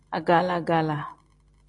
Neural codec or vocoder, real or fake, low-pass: none; real; 10.8 kHz